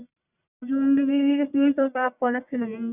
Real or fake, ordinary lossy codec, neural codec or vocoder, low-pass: fake; none; codec, 44.1 kHz, 1.7 kbps, Pupu-Codec; 3.6 kHz